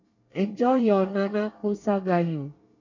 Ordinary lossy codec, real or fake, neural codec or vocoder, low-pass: none; fake; codec, 24 kHz, 1 kbps, SNAC; 7.2 kHz